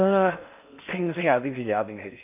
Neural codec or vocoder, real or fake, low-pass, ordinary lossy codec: codec, 16 kHz in and 24 kHz out, 0.6 kbps, FocalCodec, streaming, 4096 codes; fake; 3.6 kHz; none